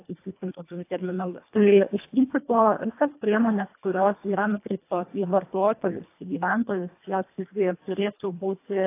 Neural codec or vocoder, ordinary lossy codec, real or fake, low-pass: codec, 24 kHz, 1.5 kbps, HILCodec; AAC, 24 kbps; fake; 3.6 kHz